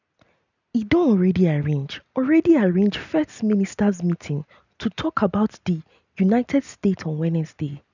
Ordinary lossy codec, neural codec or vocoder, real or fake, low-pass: none; none; real; 7.2 kHz